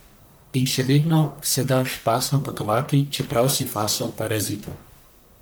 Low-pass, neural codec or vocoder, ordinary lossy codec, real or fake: none; codec, 44.1 kHz, 1.7 kbps, Pupu-Codec; none; fake